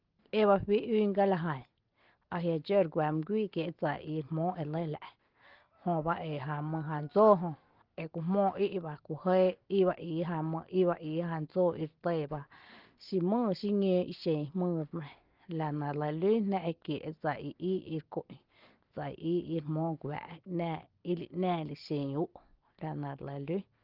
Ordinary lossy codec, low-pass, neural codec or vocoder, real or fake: Opus, 16 kbps; 5.4 kHz; none; real